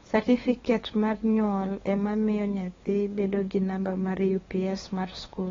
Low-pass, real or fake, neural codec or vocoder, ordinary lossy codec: 7.2 kHz; fake; codec, 16 kHz, 2 kbps, FunCodec, trained on LibriTTS, 25 frames a second; AAC, 24 kbps